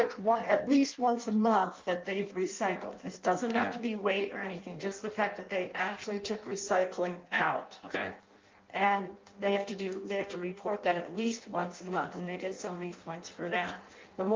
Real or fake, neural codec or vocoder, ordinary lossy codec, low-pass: fake; codec, 16 kHz in and 24 kHz out, 0.6 kbps, FireRedTTS-2 codec; Opus, 24 kbps; 7.2 kHz